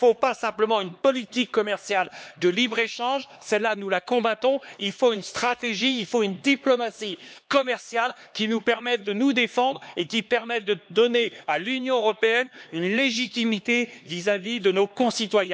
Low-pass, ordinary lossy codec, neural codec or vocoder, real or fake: none; none; codec, 16 kHz, 2 kbps, X-Codec, HuBERT features, trained on LibriSpeech; fake